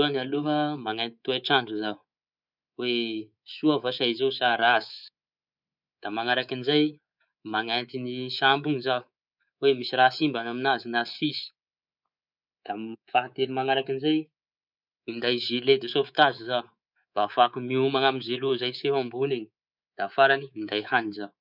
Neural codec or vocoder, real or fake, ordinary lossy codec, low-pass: codec, 24 kHz, 3.1 kbps, DualCodec; fake; none; 5.4 kHz